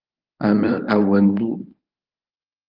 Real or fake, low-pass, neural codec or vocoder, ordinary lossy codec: fake; 5.4 kHz; codec, 24 kHz, 0.9 kbps, WavTokenizer, medium speech release version 1; Opus, 24 kbps